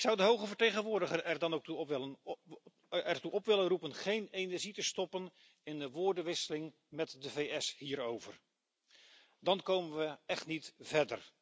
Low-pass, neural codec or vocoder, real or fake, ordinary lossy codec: none; none; real; none